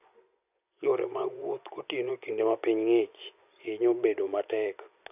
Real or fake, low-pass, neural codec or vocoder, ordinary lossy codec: fake; 3.6 kHz; vocoder, 24 kHz, 100 mel bands, Vocos; none